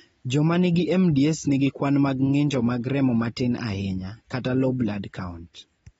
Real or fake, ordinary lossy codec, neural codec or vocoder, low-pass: real; AAC, 24 kbps; none; 19.8 kHz